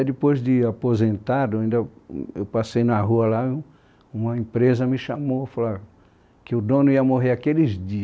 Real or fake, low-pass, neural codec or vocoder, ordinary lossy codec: real; none; none; none